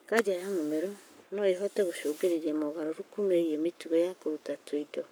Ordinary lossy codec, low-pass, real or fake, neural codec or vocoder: none; none; fake; codec, 44.1 kHz, 7.8 kbps, Pupu-Codec